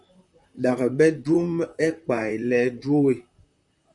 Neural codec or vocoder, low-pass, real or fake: vocoder, 44.1 kHz, 128 mel bands, Pupu-Vocoder; 10.8 kHz; fake